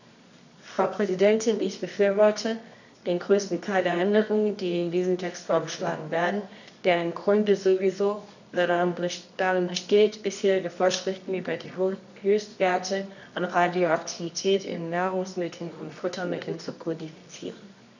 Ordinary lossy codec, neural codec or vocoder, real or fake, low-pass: none; codec, 24 kHz, 0.9 kbps, WavTokenizer, medium music audio release; fake; 7.2 kHz